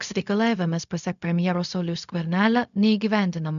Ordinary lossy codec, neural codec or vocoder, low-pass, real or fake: MP3, 96 kbps; codec, 16 kHz, 0.4 kbps, LongCat-Audio-Codec; 7.2 kHz; fake